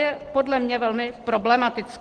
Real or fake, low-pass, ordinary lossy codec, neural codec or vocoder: real; 9.9 kHz; Opus, 16 kbps; none